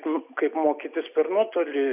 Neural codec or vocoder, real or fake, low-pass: none; real; 3.6 kHz